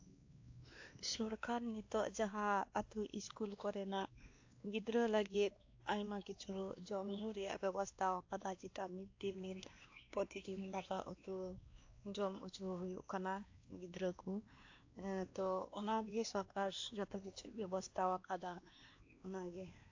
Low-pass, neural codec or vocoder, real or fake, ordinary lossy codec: 7.2 kHz; codec, 16 kHz, 2 kbps, X-Codec, WavLM features, trained on Multilingual LibriSpeech; fake; AAC, 48 kbps